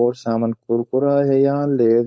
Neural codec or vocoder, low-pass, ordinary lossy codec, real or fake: codec, 16 kHz, 4.8 kbps, FACodec; none; none; fake